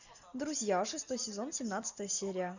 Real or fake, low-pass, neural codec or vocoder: real; 7.2 kHz; none